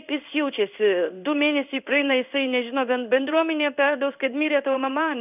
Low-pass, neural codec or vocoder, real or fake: 3.6 kHz; codec, 16 kHz in and 24 kHz out, 1 kbps, XY-Tokenizer; fake